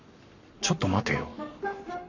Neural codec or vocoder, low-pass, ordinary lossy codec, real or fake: codec, 44.1 kHz, 7.8 kbps, Pupu-Codec; 7.2 kHz; none; fake